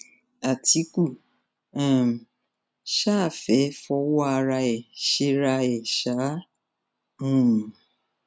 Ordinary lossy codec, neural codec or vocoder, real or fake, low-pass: none; none; real; none